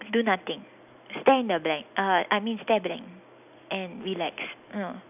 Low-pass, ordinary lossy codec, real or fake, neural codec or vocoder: 3.6 kHz; none; real; none